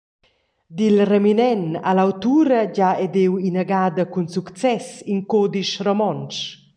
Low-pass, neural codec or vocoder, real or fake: 9.9 kHz; none; real